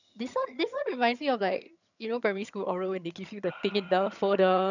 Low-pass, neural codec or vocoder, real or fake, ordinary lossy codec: 7.2 kHz; vocoder, 22.05 kHz, 80 mel bands, HiFi-GAN; fake; none